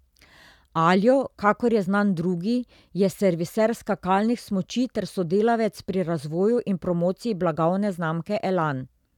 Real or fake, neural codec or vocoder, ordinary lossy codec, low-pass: real; none; none; 19.8 kHz